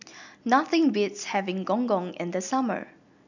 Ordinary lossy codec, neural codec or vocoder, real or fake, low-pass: none; none; real; 7.2 kHz